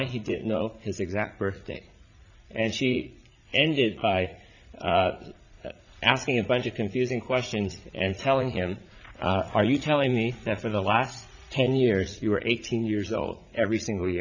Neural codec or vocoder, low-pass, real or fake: vocoder, 44.1 kHz, 80 mel bands, Vocos; 7.2 kHz; fake